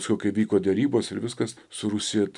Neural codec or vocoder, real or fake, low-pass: none; real; 10.8 kHz